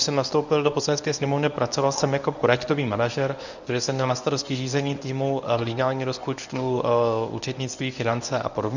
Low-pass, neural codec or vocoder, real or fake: 7.2 kHz; codec, 24 kHz, 0.9 kbps, WavTokenizer, medium speech release version 1; fake